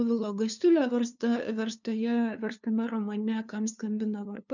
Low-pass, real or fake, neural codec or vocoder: 7.2 kHz; fake; codec, 16 kHz, 2 kbps, FunCodec, trained on LibriTTS, 25 frames a second